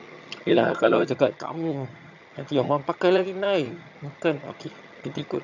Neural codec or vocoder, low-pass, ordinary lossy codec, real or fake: vocoder, 22.05 kHz, 80 mel bands, HiFi-GAN; 7.2 kHz; none; fake